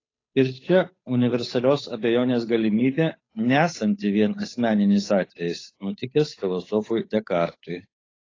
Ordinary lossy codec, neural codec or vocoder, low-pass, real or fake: AAC, 32 kbps; codec, 16 kHz, 8 kbps, FunCodec, trained on Chinese and English, 25 frames a second; 7.2 kHz; fake